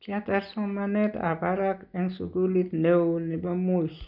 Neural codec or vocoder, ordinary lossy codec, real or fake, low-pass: none; none; real; 5.4 kHz